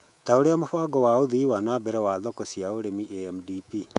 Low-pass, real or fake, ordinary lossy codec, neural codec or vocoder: 10.8 kHz; fake; none; codec, 24 kHz, 3.1 kbps, DualCodec